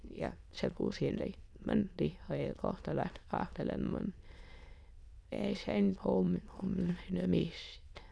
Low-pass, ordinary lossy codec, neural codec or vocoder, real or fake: none; none; autoencoder, 22.05 kHz, a latent of 192 numbers a frame, VITS, trained on many speakers; fake